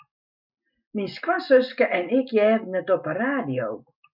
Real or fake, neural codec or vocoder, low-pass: real; none; 5.4 kHz